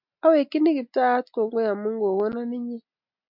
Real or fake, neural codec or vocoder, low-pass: real; none; 5.4 kHz